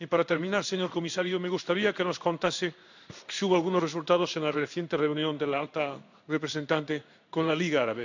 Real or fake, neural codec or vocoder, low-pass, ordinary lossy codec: fake; codec, 16 kHz in and 24 kHz out, 1 kbps, XY-Tokenizer; 7.2 kHz; none